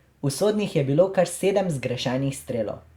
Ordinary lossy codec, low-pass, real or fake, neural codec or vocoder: Opus, 64 kbps; 19.8 kHz; real; none